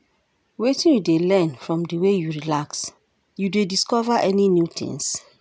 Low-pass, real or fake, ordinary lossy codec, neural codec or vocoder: none; real; none; none